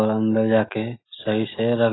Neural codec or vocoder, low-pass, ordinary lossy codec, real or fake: none; 7.2 kHz; AAC, 16 kbps; real